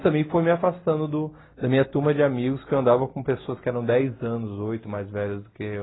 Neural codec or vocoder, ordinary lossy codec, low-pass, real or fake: none; AAC, 16 kbps; 7.2 kHz; real